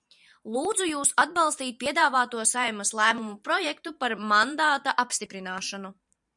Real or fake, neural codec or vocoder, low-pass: fake; vocoder, 44.1 kHz, 128 mel bands every 512 samples, BigVGAN v2; 10.8 kHz